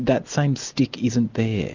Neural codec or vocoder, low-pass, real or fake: none; 7.2 kHz; real